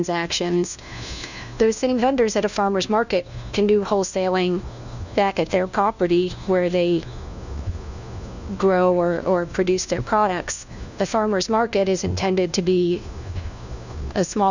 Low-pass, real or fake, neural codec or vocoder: 7.2 kHz; fake; codec, 16 kHz, 1 kbps, FunCodec, trained on LibriTTS, 50 frames a second